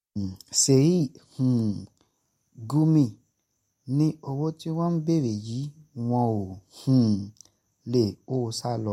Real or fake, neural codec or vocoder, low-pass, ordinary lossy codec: real; none; 19.8 kHz; MP3, 64 kbps